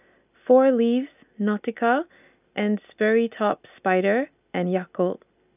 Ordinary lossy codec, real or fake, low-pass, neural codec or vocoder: none; real; 3.6 kHz; none